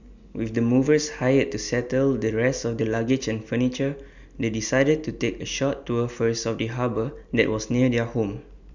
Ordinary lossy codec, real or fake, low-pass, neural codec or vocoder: none; real; 7.2 kHz; none